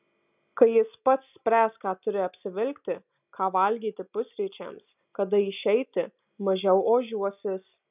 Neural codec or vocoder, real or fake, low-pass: none; real; 3.6 kHz